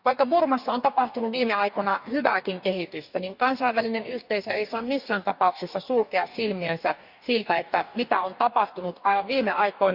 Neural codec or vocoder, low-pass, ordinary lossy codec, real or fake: codec, 44.1 kHz, 2.6 kbps, DAC; 5.4 kHz; none; fake